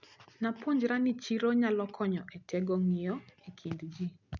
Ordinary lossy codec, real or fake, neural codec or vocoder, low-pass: none; real; none; 7.2 kHz